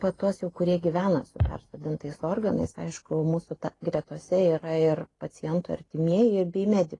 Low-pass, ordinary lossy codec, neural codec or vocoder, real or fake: 10.8 kHz; AAC, 32 kbps; none; real